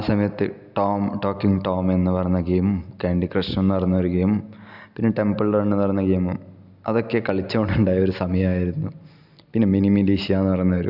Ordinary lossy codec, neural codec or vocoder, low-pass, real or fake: none; none; 5.4 kHz; real